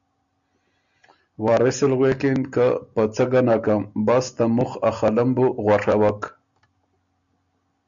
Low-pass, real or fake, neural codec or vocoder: 7.2 kHz; real; none